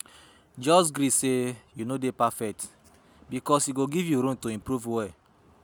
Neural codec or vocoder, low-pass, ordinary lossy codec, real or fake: none; none; none; real